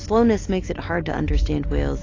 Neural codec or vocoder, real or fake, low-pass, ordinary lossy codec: none; real; 7.2 kHz; AAC, 32 kbps